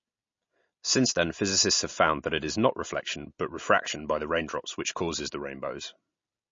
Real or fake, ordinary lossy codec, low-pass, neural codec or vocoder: real; MP3, 32 kbps; 7.2 kHz; none